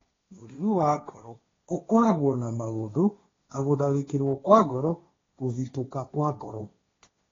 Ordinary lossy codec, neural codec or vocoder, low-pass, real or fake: AAC, 32 kbps; codec, 16 kHz, 1.1 kbps, Voila-Tokenizer; 7.2 kHz; fake